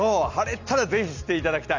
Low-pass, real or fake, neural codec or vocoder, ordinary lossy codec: 7.2 kHz; real; none; Opus, 64 kbps